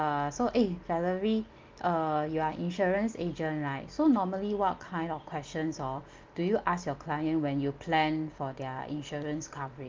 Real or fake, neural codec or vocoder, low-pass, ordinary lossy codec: real; none; 7.2 kHz; Opus, 32 kbps